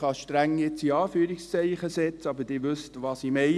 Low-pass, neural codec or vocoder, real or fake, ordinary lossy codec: none; none; real; none